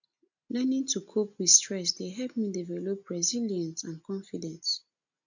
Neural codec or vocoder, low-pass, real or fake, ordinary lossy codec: none; 7.2 kHz; real; none